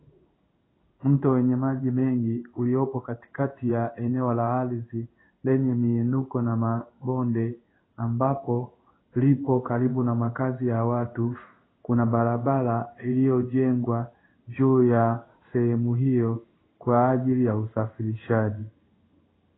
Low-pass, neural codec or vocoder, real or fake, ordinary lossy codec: 7.2 kHz; codec, 16 kHz in and 24 kHz out, 1 kbps, XY-Tokenizer; fake; AAC, 16 kbps